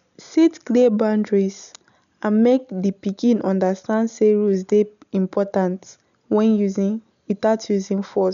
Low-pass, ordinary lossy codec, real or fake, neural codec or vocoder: 7.2 kHz; none; real; none